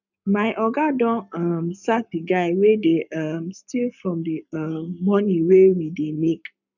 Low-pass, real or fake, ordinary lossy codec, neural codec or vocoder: 7.2 kHz; fake; none; codec, 44.1 kHz, 7.8 kbps, Pupu-Codec